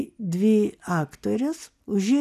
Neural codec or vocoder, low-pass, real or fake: none; 14.4 kHz; real